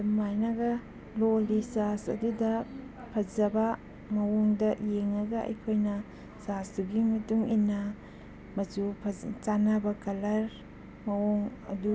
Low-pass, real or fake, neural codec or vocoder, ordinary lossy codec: none; real; none; none